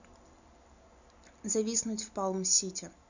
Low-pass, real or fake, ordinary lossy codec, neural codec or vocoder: 7.2 kHz; real; none; none